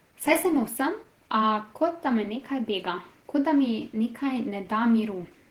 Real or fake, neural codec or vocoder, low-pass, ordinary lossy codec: fake; vocoder, 48 kHz, 128 mel bands, Vocos; 19.8 kHz; Opus, 16 kbps